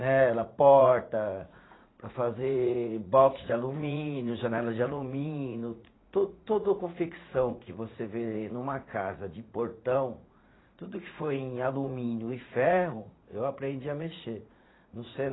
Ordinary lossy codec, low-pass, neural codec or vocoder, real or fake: AAC, 16 kbps; 7.2 kHz; vocoder, 44.1 kHz, 80 mel bands, Vocos; fake